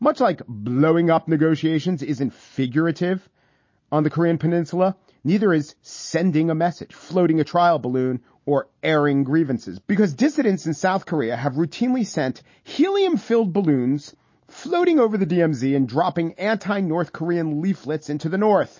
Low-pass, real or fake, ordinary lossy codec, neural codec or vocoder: 7.2 kHz; real; MP3, 32 kbps; none